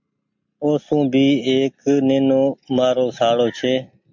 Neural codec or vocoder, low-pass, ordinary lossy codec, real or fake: none; 7.2 kHz; MP3, 48 kbps; real